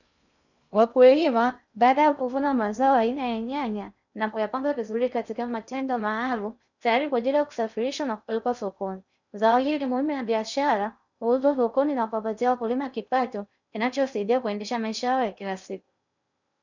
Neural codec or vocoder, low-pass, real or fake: codec, 16 kHz in and 24 kHz out, 0.6 kbps, FocalCodec, streaming, 2048 codes; 7.2 kHz; fake